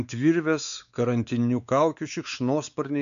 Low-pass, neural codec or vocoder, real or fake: 7.2 kHz; codec, 16 kHz, 4 kbps, X-Codec, WavLM features, trained on Multilingual LibriSpeech; fake